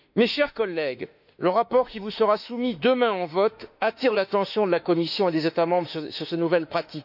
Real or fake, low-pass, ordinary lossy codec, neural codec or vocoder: fake; 5.4 kHz; none; autoencoder, 48 kHz, 32 numbers a frame, DAC-VAE, trained on Japanese speech